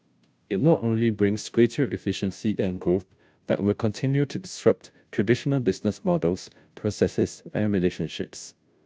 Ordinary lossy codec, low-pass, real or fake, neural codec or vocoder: none; none; fake; codec, 16 kHz, 0.5 kbps, FunCodec, trained on Chinese and English, 25 frames a second